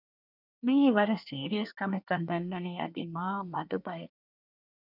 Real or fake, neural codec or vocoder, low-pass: fake; codec, 24 kHz, 1 kbps, SNAC; 5.4 kHz